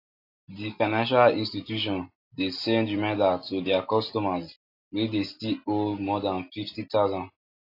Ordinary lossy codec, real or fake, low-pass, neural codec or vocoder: AAC, 32 kbps; real; 5.4 kHz; none